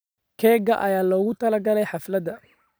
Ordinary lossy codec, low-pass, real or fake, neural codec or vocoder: none; none; real; none